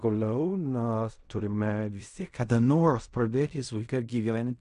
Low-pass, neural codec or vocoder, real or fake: 10.8 kHz; codec, 16 kHz in and 24 kHz out, 0.4 kbps, LongCat-Audio-Codec, fine tuned four codebook decoder; fake